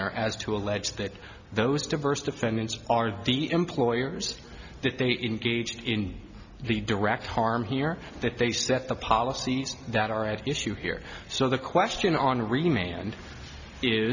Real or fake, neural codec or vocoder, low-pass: real; none; 7.2 kHz